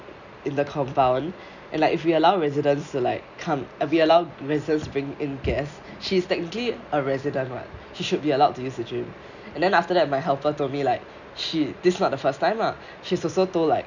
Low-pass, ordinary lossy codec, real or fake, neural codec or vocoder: 7.2 kHz; none; real; none